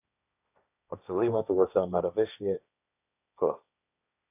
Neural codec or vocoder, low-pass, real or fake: codec, 16 kHz, 1.1 kbps, Voila-Tokenizer; 3.6 kHz; fake